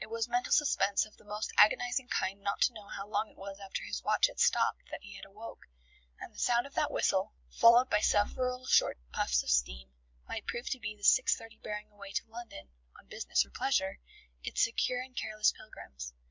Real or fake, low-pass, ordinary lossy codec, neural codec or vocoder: real; 7.2 kHz; MP3, 64 kbps; none